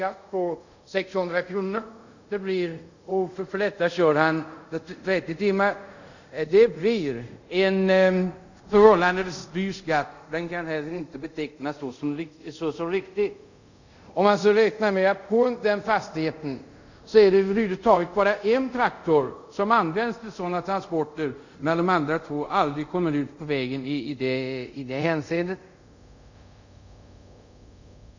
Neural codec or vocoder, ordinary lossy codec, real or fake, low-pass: codec, 24 kHz, 0.5 kbps, DualCodec; none; fake; 7.2 kHz